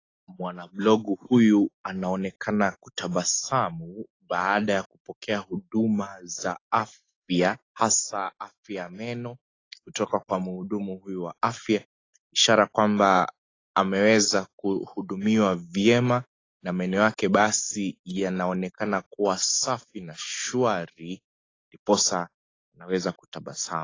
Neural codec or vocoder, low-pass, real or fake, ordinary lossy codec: none; 7.2 kHz; real; AAC, 32 kbps